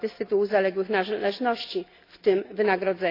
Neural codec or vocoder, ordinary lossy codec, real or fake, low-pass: none; AAC, 32 kbps; real; 5.4 kHz